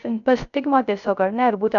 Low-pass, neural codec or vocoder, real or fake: 7.2 kHz; codec, 16 kHz, 0.3 kbps, FocalCodec; fake